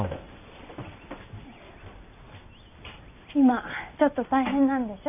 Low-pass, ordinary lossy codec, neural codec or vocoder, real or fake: 3.6 kHz; none; none; real